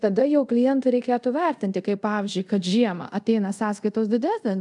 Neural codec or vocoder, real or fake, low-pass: codec, 24 kHz, 0.5 kbps, DualCodec; fake; 10.8 kHz